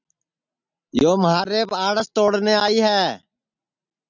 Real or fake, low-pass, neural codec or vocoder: real; 7.2 kHz; none